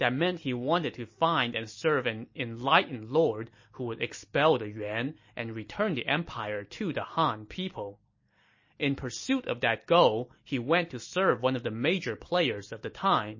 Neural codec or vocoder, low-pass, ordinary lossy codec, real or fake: none; 7.2 kHz; MP3, 32 kbps; real